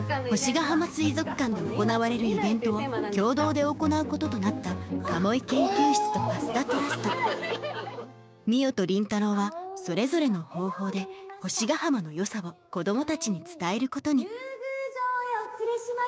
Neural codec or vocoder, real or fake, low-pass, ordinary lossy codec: codec, 16 kHz, 6 kbps, DAC; fake; none; none